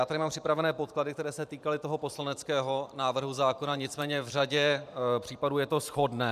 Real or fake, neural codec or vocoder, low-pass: fake; vocoder, 44.1 kHz, 128 mel bands every 512 samples, BigVGAN v2; 14.4 kHz